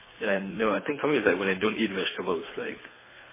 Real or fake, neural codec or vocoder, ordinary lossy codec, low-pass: fake; codec, 24 kHz, 3 kbps, HILCodec; MP3, 16 kbps; 3.6 kHz